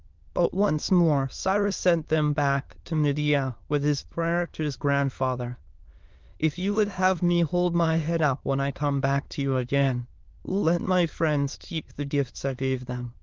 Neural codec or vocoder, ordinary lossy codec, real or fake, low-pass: autoencoder, 22.05 kHz, a latent of 192 numbers a frame, VITS, trained on many speakers; Opus, 24 kbps; fake; 7.2 kHz